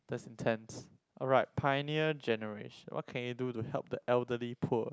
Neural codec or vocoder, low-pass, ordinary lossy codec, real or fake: none; none; none; real